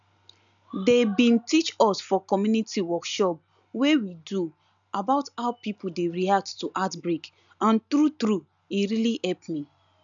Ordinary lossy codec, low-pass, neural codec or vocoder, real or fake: none; 7.2 kHz; none; real